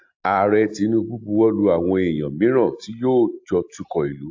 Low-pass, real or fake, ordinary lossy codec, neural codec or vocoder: 7.2 kHz; real; AAC, 48 kbps; none